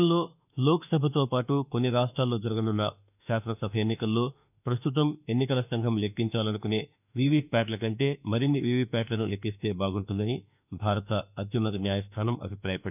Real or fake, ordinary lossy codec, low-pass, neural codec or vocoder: fake; none; 3.6 kHz; autoencoder, 48 kHz, 32 numbers a frame, DAC-VAE, trained on Japanese speech